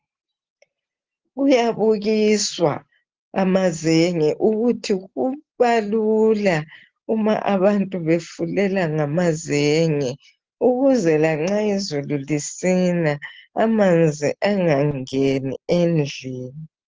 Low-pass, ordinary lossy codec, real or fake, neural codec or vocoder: 7.2 kHz; Opus, 16 kbps; real; none